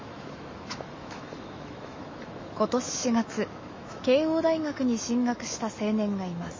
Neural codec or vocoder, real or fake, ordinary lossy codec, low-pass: autoencoder, 48 kHz, 128 numbers a frame, DAC-VAE, trained on Japanese speech; fake; MP3, 32 kbps; 7.2 kHz